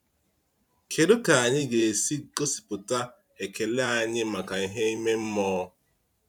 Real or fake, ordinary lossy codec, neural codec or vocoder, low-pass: fake; none; vocoder, 44.1 kHz, 128 mel bands every 512 samples, BigVGAN v2; 19.8 kHz